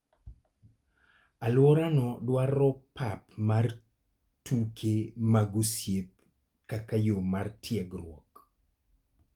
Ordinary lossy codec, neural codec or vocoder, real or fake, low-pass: Opus, 32 kbps; none; real; 19.8 kHz